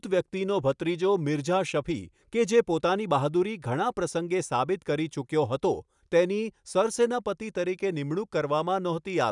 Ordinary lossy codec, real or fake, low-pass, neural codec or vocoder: none; real; 10.8 kHz; none